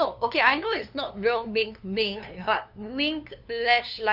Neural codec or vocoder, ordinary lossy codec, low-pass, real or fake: codec, 16 kHz, 2 kbps, FunCodec, trained on LibriTTS, 25 frames a second; none; 5.4 kHz; fake